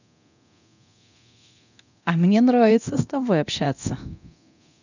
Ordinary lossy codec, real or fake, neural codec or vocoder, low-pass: none; fake; codec, 24 kHz, 0.9 kbps, DualCodec; 7.2 kHz